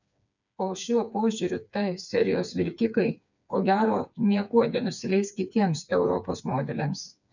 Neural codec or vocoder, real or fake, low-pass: codec, 16 kHz, 4 kbps, FreqCodec, smaller model; fake; 7.2 kHz